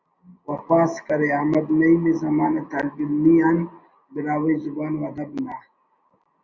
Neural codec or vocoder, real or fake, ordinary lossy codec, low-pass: none; real; Opus, 64 kbps; 7.2 kHz